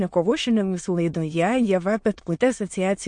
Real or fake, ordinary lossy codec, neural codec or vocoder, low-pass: fake; MP3, 48 kbps; autoencoder, 22.05 kHz, a latent of 192 numbers a frame, VITS, trained on many speakers; 9.9 kHz